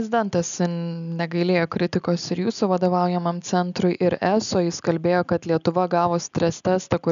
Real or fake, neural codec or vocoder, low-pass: real; none; 7.2 kHz